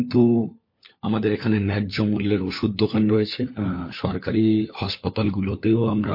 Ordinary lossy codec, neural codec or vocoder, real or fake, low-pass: MP3, 32 kbps; codec, 16 kHz, 4 kbps, FunCodec, trained on LibriTTS, 50 frames a second; fake; 5.4 kHz